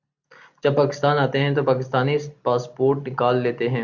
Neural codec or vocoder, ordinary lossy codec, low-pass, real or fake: none; Opus, 64 kbps; 7.2 kHz; real